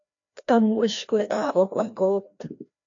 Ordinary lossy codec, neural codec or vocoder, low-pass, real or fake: AAC, 48 kbps; codec, 16 kHz, 1 kbps, FreqCodec, larger model; 7.2 kHz; fake